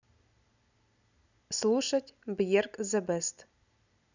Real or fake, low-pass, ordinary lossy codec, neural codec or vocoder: real; 7.2 kHz; none; none